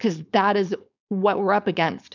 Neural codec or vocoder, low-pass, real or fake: codec, 16 kHz, 4.8 kbps, FACodec; 7.2 kHz; fake